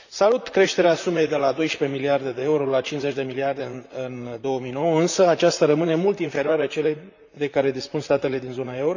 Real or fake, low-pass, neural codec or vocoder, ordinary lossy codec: fake; 7.2 kHz; vocoder, 44.1 kHz, 128 mel bands, Pupu-Vocoder; none